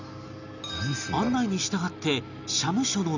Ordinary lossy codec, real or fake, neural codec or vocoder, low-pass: none; real; none; 7.2 kHz